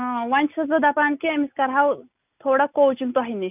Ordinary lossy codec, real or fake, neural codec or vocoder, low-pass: none; real; none; 3.6 kHz